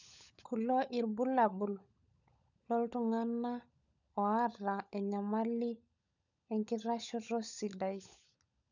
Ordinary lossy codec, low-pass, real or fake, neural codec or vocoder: none; 7.2 kHz; fake; codec, 16 kHz, 16 kbps, FunCodec, trained on LibriTTS, 50 frames a second